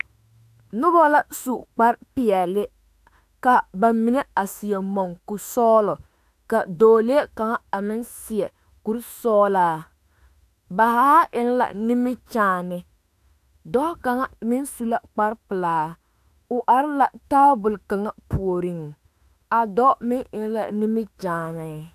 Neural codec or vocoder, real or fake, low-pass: autoencoder, 48 kHz, 32 numbers a frame, DAC-VAE, trained on Japanese speech; fake; 14.4 kHz